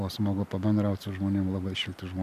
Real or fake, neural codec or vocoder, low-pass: real; none; 14.4 kHz